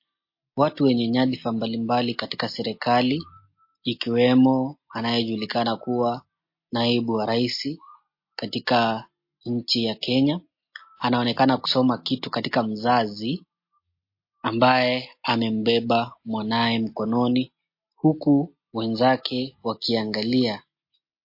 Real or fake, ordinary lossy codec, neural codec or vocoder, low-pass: real; MP3, 32 kbps; none; 5.4 kHz